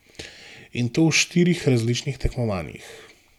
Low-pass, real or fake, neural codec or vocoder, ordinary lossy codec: 19.8 kHz; real; none; none